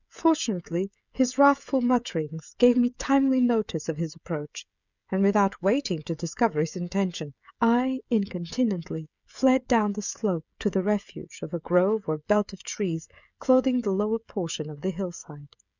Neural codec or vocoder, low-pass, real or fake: codec, 16 kHz, 16 kbps, FreqCodec, smaller model; 7.2 kHz; fake